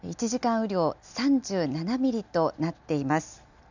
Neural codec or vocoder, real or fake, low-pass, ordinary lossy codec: none; real; 7.2 kHz; none